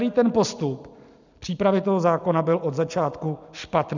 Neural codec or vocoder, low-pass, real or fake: none; 7.2 kHz; real